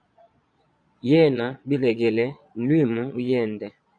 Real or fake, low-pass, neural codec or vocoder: fake; 9.9 kHz; vocoder, 22.05 kHz, 80 mel bands, Vocos